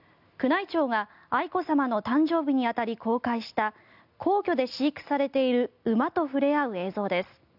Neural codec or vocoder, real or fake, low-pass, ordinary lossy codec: none; real; 5.4 kHz; none